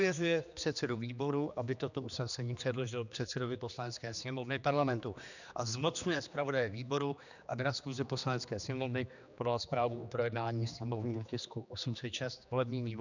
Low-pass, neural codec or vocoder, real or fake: 7.2 kHz; codec, 16 kHz, 2 kbps, X-Codec, HuBERT features, trained on general audio; fake